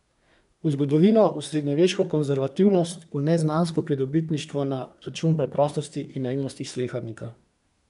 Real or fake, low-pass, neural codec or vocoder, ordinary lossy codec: fake; 10.8 kHz; codec, 24 kHz, 1 kbps, SNAC; none